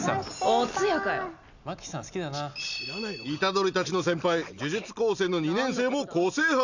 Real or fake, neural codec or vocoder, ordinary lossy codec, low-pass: real; none; none; 7.2 kHz